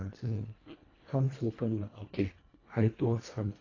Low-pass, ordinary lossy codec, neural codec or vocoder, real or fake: 7.2 kHz; AAC, 32 kbps; codec, 24 kHz, 1.5 kbps, HILCodec; fake